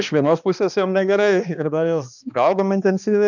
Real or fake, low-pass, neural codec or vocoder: fake; 7.2 kHz; codec, 16 kHz, 2 kbps, X-Codec, HuBERT features, trained on balanced general audio